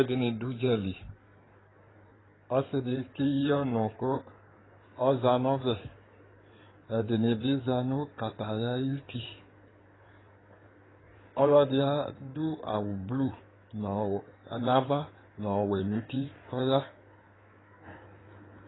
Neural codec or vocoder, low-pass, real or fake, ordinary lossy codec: codec, 16 kHz in and 24 kHz out, 2.2 kbps, FireRedTTS-2 codec; 7.2 kHz; fake; AAC, 16 kbps